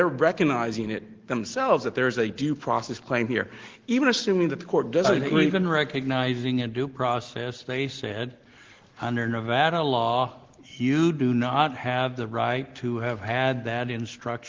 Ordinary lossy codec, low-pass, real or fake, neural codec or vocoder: Opus, 16 kbps; 7.2 kHz; real; none